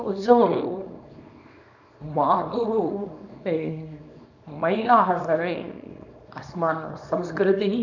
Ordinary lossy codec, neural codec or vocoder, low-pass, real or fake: none; codec, 24 kHz, 0.9 kbps, WavTokenizer, small release; 7.2 kHz; fake